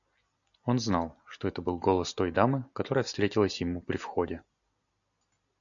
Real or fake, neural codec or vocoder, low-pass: real; none; 7.2 kHz